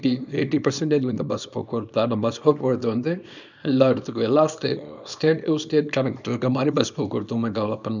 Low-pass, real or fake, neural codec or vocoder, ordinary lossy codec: 7.2 kHz; fake; codec, 24 kHz, 0.9 kbps, WavTokenizer, small release; none